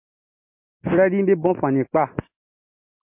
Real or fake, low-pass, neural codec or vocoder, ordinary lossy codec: real; 3.6 kHz; none; MP3, 32 kbps